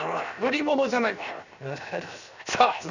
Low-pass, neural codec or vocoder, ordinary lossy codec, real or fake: 7.2 kHz; codec, 16 kHz, 0.7 kbps, FocalCodec; none; fake